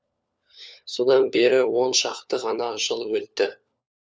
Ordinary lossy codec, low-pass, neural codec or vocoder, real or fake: none; none; codec, 16 kHz, 4 kbps, FunCodec, trained on LibriTTS, 50 frames a second; fake